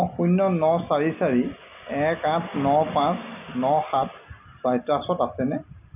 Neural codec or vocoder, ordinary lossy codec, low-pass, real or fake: none; none; 3.6 kHz; real